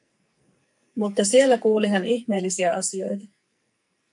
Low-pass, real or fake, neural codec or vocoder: 10.8 kHz; fake; codec, 44.1 kHz, 2.6 kbps, SNAC